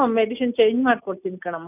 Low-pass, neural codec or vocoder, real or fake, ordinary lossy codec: 3.6 kHz; none; real; none